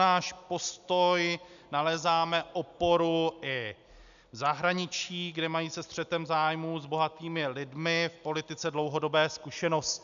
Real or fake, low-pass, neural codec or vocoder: real; 7.2 kHz; none